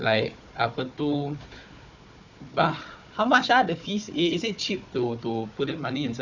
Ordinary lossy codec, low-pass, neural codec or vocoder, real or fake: none; 7.2 kHz; codec, 16 kHz, 4 kbps, FunCodec, trained on Chinese and English, 50 frames a second; fake